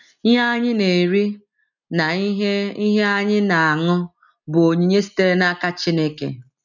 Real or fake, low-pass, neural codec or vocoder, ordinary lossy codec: real; 7.2 kHz; none; none